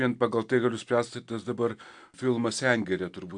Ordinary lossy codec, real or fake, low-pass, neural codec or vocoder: AAC, 64 kbps; real; 9.9 kHz; none